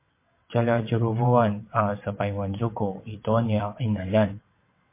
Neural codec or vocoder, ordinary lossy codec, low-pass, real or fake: vocoder, 22.05 kHz, 80 mel bands, WaveNeXt; MP3, 24 kbps; 3.6 kHz; fake